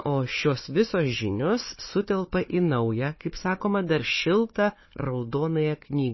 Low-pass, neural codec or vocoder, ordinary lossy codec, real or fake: 7.2 kHz; codec, 16 kHz, 4 kbps, FunCodec, trained on Chinese and English, 50 frames a second; MP3, 24 kbps; fake